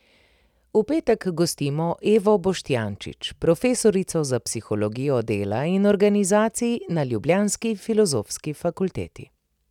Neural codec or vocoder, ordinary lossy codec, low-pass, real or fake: none; none; 19.8 kHz; real